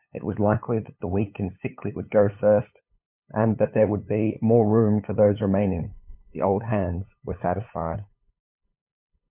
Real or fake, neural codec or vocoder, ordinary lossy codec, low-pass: fake; codec, 16 kHz, 8 kbps, FunCodec, trained on LibriTTS, 25 frames a second; MP3, 32 kbps; 3.6 kHz